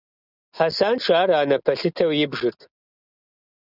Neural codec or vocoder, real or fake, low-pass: none; real; 5.4 kHz